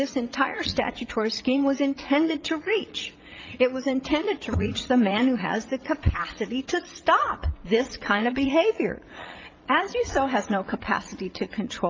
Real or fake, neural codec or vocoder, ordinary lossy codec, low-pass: fake; vocoder, 44.1 kHz, 80 mel bands, Vocos; Opus, 24 kbps; 7.2 kHz